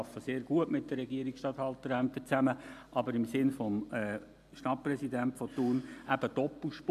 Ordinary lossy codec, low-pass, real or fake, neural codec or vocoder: MP3, 96 kbps; 14.4 kHz; real; none